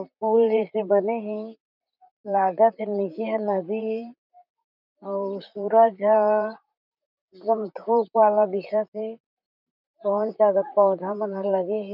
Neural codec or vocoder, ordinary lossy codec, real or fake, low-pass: vocoder, 44.1 kHz, 128 mel bands, Pupu-Vocoder; none; fake; 5.4 kHz